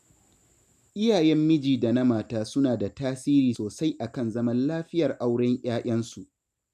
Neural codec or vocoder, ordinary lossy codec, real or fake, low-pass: none; none; real; 14.4 kHz